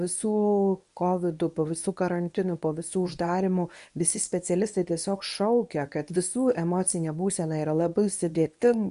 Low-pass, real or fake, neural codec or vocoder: 10.8 kHz; fake; codec, 24 kHz, 0.9 kbps, WavTokenizer, medium speech release version 1